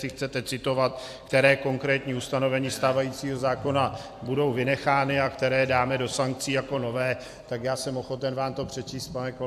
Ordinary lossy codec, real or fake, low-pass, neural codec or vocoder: AAC, 96 kbps; fake; 14.4 kHz; vocoder, 44.1 kHz, 128 mel bands every 256 samples, BigVGAN v2